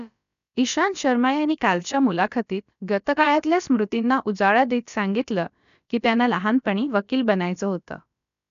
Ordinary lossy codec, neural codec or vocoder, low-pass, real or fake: none; codec, 16 kHz, about 1 kbps, DyCAST, with the encoder's durations; 7.2 kHz; fake